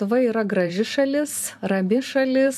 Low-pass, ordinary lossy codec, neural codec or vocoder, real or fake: 14.4 kHz; MP3, 96 kbps; vocoder, 44.1 kHz, 128 mel bands every 512 samples, BigVGAN v2; fake